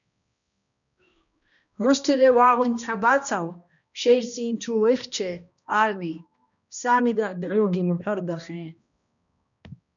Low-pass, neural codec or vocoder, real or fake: 7.2 kHz; codec, 16 kHz, 1 kbps, X-Codec, HuBERT features, trained on balanced general audio; fake